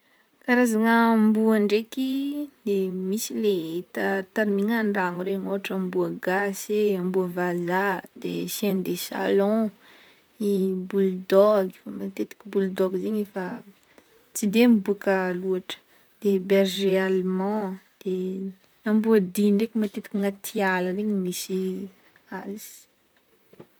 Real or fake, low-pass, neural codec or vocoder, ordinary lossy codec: fake; none; vocoder, 44.1 kHz, 128 mel bands, Pupu-Vocoder; none